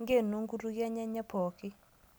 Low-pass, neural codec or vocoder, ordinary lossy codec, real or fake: none; none; none; real